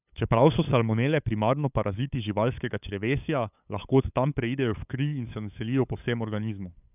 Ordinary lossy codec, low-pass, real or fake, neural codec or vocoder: none; 3.6 kHz; fake; codec, 16 kHz, 8 kbps, FunCodec, trained on LibriTTS, 25 frames a second